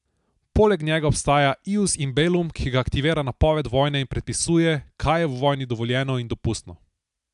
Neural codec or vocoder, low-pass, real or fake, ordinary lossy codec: none; 10.8 kHz; real; AAC, 96 kbps